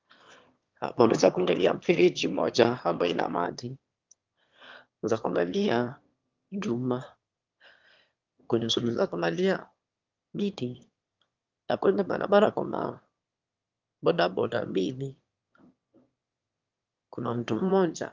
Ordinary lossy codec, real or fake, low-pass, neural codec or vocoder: Opus, 32 kbps; fake; 7.2 kHz; autoencoder, 22.05 kHz, a latent of 192 numbers a frame, VITS, trained on one speaker